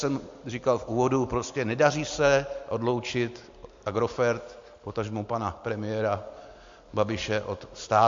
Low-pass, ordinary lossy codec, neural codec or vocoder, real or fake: 7.2 kHz; MP3, 48 kbps; none; real